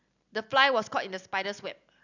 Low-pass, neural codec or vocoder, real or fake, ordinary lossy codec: 7.2 kHz; none; real; none